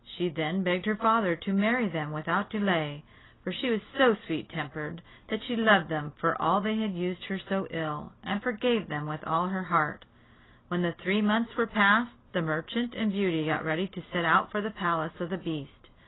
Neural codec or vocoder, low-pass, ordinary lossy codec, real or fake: none; 7.2 kHz; AAC, 16 kbps; real